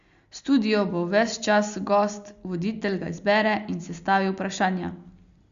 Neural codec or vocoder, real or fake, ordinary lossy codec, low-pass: none; real; Opus, 64 kbps; 7.2 kHz